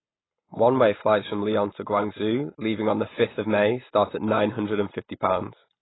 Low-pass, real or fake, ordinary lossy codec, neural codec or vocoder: 7.2 kHz; real; AAC, 16 kbps; none